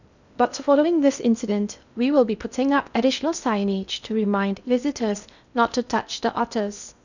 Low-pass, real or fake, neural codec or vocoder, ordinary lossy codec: 7.2 kHz; fake; codec, 16 kHz in and 24 kHz out, 0.6 kbps, FocalCodec, streaming, 2048 codes; none